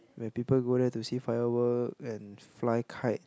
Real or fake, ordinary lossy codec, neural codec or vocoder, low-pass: real; none; none; none